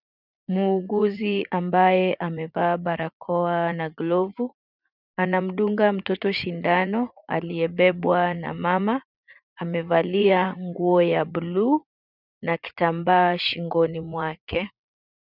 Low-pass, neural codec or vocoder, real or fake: 5.4 kHz; vocoder, 44.1 kHz, 80 mel bands, Vocos; fake